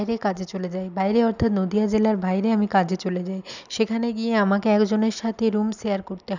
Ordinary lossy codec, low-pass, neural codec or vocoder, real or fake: none; 7.2 kHz; none; real